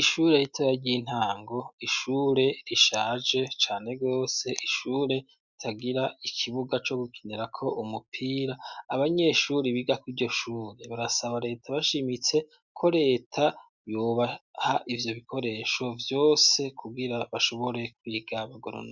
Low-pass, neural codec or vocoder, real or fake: 7.2 kHz; none; real